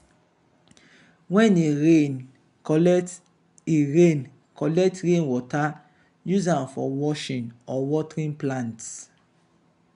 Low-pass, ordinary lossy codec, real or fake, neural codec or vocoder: 10.8 kHz; none; real; none